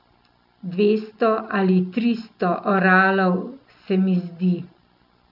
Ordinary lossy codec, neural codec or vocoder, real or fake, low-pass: none; none; real; 5.4 kHz